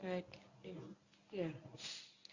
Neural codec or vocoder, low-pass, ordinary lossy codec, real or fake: codec, 24 kHz, 0.9 kbps, WavTokenizer, medium speech release version 1; 7.2 kHz; Opus, 64 kbps; fake